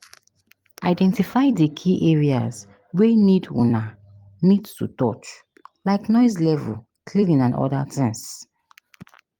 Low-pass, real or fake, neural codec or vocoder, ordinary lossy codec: 19.8 kHz; fake; vocoder, 44.1 kHz, 128 mel bands every 256 samples, BigVGAN v2; Opus, 32 kbps